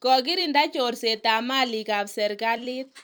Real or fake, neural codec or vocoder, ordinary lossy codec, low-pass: fake; vocoder, 44.1 kHz, 128 mel bands every 256 samples, BigVGAN v2; none; none